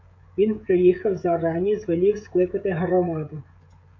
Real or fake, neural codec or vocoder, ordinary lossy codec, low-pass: fake; codec, 16 kHz, 16 kbps, FreqCodec, smaller model; MP3, 48 kbps; 7.2 kHz